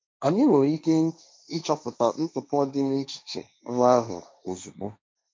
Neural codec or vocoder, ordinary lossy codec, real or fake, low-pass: codec, 16 kHz, 1.1 kbps, Voila-Tokenizer; none; fake; none